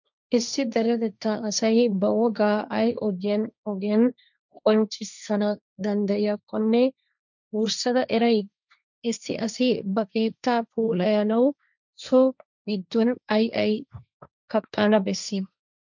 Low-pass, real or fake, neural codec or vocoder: 7.2 kHz; fake; codec, 16 kHz, 1.1 kbps, Voila-Tokenizer